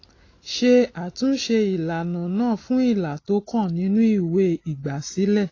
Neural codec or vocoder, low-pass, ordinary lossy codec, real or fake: none; 7.2 kHz; AAC, 32 kbps; real